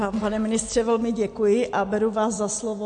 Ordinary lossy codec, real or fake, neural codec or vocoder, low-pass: MP3, 48 kbps; real; none; 9.9 kHz